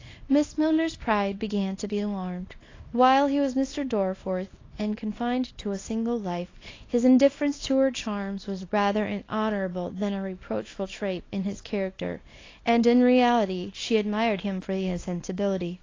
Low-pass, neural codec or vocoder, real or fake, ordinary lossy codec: 7.2 kHz; codec, 24 kHz, 0.9 kbps, WavTokenizer, small release; fake; AAC, 32 kbps